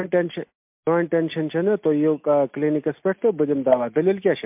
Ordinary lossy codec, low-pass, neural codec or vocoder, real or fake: MP3, 32 kbps; 3.6 kHz; none; real